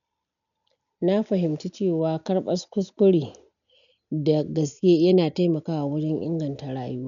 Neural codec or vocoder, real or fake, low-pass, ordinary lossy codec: none; real; 7.2 kHz; none